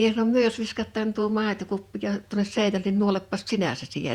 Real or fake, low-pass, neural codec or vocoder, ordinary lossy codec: fake; 19.8 kHz; vocoder, 48 kHz, 128 mel bands, Vocos; Opus, 64 kbps